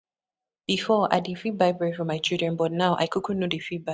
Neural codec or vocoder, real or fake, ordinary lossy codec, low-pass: none; real; none; none